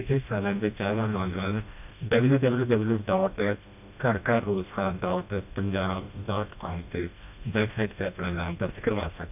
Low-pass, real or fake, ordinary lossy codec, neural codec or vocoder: 3.6 kHz; fake; none; codec, 16 kHz, 1 kbps, FreqCodec, smaller model